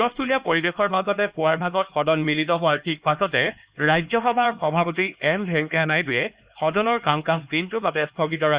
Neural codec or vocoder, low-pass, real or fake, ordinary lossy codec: codec, 16 kHz, 2 kbps, X-Codec, HuBERT features, trained on LibriSpeech; 3.6 kHz; fake; Opus, 64 kbps